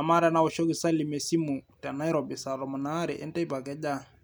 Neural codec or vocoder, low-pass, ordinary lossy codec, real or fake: none; none; none; real